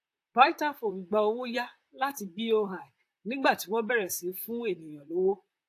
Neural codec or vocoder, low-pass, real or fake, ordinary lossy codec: vocoder, 44.1 kHz, 128 mel bands, Pupu-Vocoder; 14.4 kHz; fake; AAC, 64 kbps